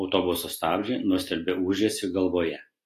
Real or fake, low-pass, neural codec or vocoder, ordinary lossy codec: real; 14.4 kHz; none; AAC, 48 kbps